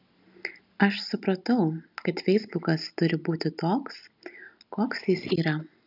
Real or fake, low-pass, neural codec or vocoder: real; 5.4 kHz; none